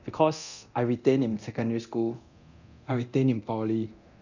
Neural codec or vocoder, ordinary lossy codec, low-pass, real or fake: codec, 24 kHz, 0.9 kbps, DualCodec; none; 7.2 kHz; fake